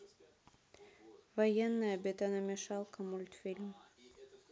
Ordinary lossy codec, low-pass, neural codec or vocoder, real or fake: none; none; none; real